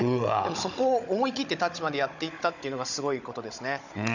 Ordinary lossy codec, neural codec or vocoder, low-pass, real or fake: none; codec, 16 kHz, 16 kbps, FunCodec, trained on Chinese and English, 50 frames a second; 7.2 kHz; fake